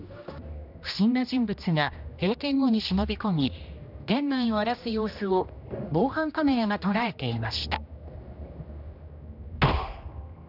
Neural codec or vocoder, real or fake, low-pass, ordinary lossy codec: codec, 16 kHz, 1 kbps, X-Codec, HuBERT features, trained on general audio; fake; 5.4 kHz; none